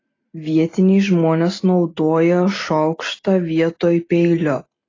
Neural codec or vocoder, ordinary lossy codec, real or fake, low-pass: none; AAC, 32 kbps; real; 7.2 kHz